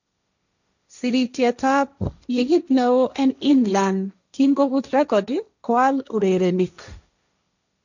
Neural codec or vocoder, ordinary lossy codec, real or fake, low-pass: codec, 16 kHz, 1.1 kbps, Voila-Tokenizer; none; fake; 7.2 kHz